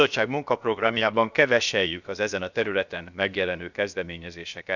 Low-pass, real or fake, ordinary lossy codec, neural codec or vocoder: 7.2 kHz; fake; none; codec, 16 kHz, about 1 kbps, DyCAST, with the encoder's durations